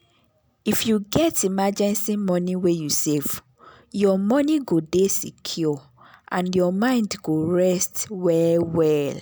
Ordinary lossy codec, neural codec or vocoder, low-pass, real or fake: none; none; none; real